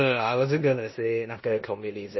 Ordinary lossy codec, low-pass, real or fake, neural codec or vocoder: MP3, 24 kbps; 7.2 kHz; fake; codec, 16 kHz in and 24 kHz out, 0.9 kbps, LongCat-Audio-Codec, four codebook decoder